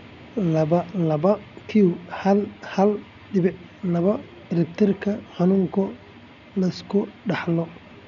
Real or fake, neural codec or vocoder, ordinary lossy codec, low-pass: real; none; none; 7.2 kHz